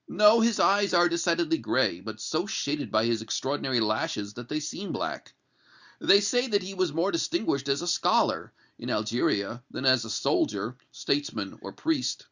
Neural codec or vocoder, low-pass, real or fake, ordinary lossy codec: none; 7.2 kHz; real; Opus, 64 kbps